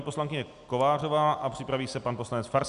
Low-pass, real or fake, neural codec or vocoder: 10.8 kHz; real; none